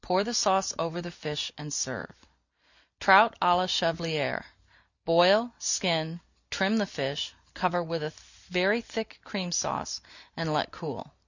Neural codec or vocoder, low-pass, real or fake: none; 7.2 kHz; real